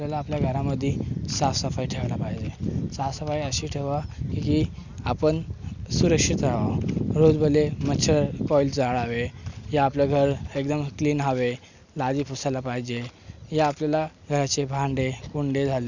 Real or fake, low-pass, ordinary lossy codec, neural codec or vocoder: real; 7.2 kHz; none; none